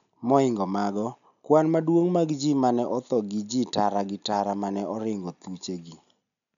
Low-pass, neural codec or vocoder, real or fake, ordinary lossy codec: 7.2 kHz; none; real; none